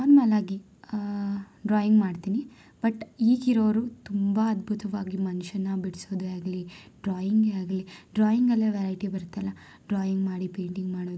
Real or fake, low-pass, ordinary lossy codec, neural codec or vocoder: real; none; none; none